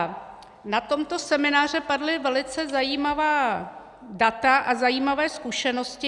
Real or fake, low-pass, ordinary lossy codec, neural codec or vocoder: real; 10.8 kHz; Opus, 64 kbps; none